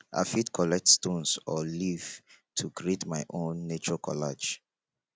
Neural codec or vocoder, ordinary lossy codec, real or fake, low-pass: none; none; real; none